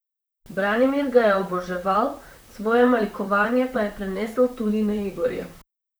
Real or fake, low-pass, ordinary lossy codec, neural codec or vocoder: fake; none; none; vocoder, 44.1 kHz, 128 mel bands, Pupu-Vocoder